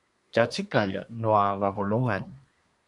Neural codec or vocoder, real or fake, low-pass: codec, 24 kHz, 1 kbps, SNAC; fake; 10.8 kHz